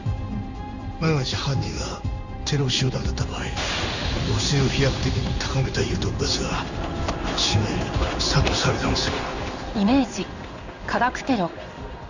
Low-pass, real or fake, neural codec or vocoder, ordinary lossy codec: 7.2 kHz; fake; codec, 16 kHz in and 24 kHz out, 1 kbps, XY-Tokenizer; none